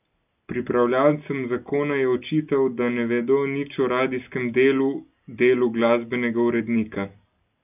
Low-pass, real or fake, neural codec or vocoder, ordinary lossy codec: 3.6 kHz; real; none; none